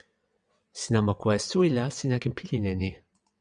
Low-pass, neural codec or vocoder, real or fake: 9.9 kHz; vocoder, 22.05 kHz, 80 mel bands, WaveNeXt; fake